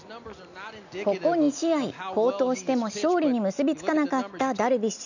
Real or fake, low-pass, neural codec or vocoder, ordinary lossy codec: real; 7.2 kHz; none; none